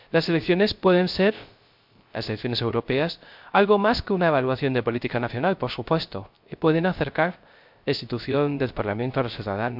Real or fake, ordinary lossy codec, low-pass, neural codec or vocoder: fake; MP3, 48 kbps; 5.4 kHz; codec, 16 kHz, 0.3 kbps, FocalCodec